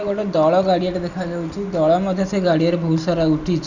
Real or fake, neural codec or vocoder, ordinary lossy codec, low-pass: real; none; none; 7.2 kHz